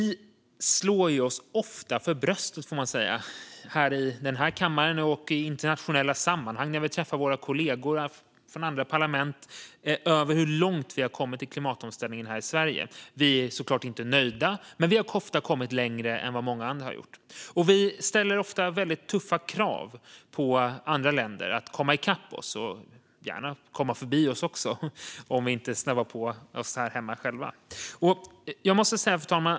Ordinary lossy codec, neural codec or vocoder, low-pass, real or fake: none; none; none; real